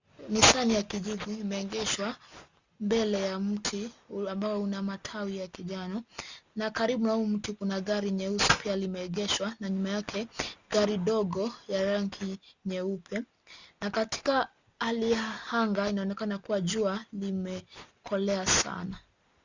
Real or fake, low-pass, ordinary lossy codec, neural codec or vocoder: real; 7.2 kHz; Opus, 64 kbps; none